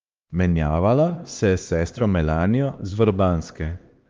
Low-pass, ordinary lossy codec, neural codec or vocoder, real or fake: 7.2 kHz; Opus, 32 kbps; codec, 16 kHz, 2 kbps, X-Codec, HuBERT features, trained on LibriSpeech; fake